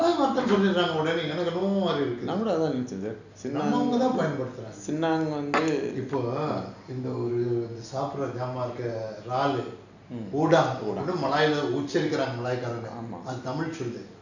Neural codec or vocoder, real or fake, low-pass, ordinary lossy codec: none; real; 7.2 kHz; none